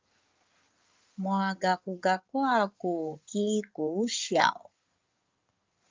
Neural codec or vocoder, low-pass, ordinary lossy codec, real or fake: codec, 16 kHz in and 24 kHz out, 2.2 kbps, FireRedTTS-2 codec; 7.2 kHz; Opus, 24 kbps; fake